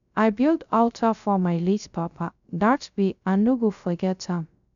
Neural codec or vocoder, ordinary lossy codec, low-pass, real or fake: codec, 16 kHz, 0.3 kbps, FocalCodec; none; 7.2 kHz; fake